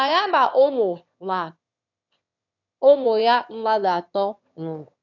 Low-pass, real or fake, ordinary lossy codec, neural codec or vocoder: 7.2 kHz; fake; none; autoencoder, 22.05 kHz, a latent of 192 numbers a frame, VITS, trained on one speaker